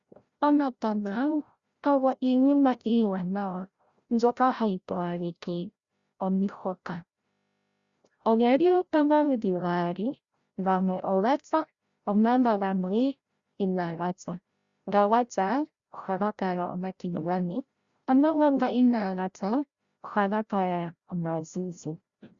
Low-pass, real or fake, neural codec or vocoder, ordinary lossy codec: 7.2 kHz; fake; codec, 16 kHz, 0.5 kbps, FreqCodec, larger model; Opus, 64 kbps